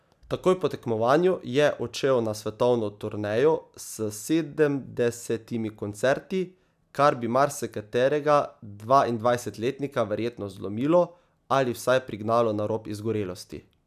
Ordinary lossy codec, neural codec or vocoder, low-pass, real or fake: none; none; 14.4 kHz; real